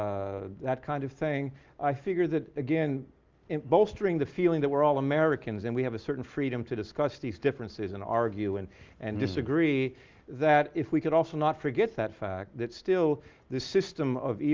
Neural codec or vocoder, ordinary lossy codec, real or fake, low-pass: none; Opus, 32 kbps; real; 7.2 kHz